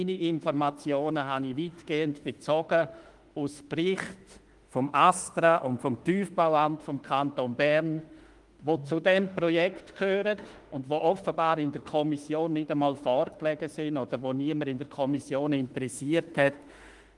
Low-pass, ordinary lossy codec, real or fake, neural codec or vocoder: 10.8 kHz; Opus, 24 kbps; fake; autoencoder, 48 kHz, 32 numbers a frame, DAC-VAE, trained on Japanese speech